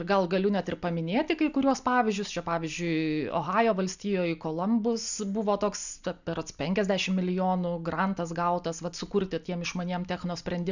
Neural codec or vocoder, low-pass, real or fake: none; 7.2 kHz; real